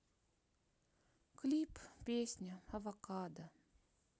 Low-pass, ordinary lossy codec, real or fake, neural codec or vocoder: none; none; real; none